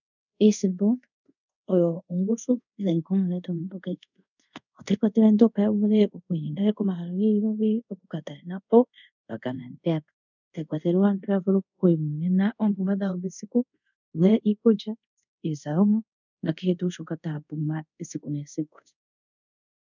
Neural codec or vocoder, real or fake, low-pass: codec, 24 kHz, 0.5 kbps, DualCodec; fake; 7.2 kHz